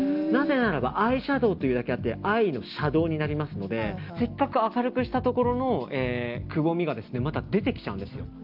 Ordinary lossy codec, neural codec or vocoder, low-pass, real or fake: Opus, 24 kbps; none; 5.4 kHz; real